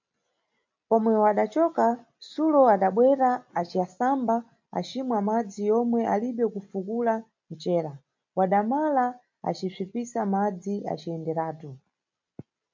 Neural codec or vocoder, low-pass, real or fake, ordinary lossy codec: none; 7.2 kHz; real; MP3, 64 kbps